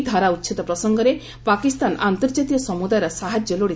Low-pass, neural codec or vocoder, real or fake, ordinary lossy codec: none; none; real; none